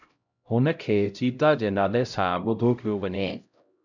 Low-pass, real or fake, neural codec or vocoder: 7.2 kHz; fake; codec, 16 kHz, 0.5 kbps, X-Codec, HuBERT features, trained on LibriSpeech